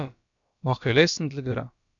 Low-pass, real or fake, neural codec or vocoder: 7.2 kHz; fake; codec, 16 kHz, about 1 kbps, DyCAST, with the encoder's durations